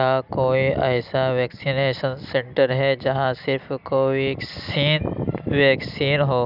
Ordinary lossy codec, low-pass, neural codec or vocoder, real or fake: none; 5.4 kHz; none; real